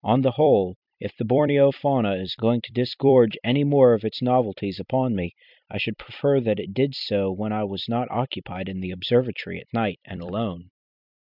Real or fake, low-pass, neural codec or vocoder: fake; 5.4 kHz; vocoder, 44.1 kHz, 128 mel bands every 256 samples, BigVGAN v2